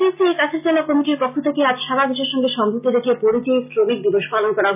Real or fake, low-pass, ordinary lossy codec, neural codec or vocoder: real; 3.6 kHz; none; none